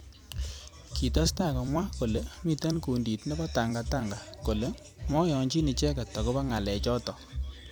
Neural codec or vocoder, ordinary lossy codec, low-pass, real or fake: vocoder, 44.1 kHz, 128 mel bands every 256 samples, BigVGAN v2; none; none; fake